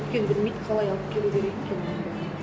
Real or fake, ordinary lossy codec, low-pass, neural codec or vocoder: real; none; none; none